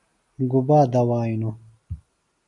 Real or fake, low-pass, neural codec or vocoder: real; 10.8 kHz; none